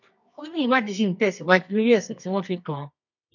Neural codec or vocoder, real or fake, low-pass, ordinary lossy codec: codec, 24 kHz, 0.9 kbps, WavTokenizer, medium music audio release; fake; 7.2 kHz; none